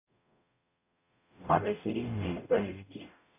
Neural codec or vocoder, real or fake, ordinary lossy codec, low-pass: codec, 44.1 kHz, 0.9 kbps, DAC; fake; none; 3.6 kHz